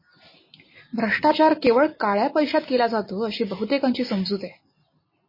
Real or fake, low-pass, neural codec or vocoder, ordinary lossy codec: real; 5.4 kHz; none; MP3, 24 kbps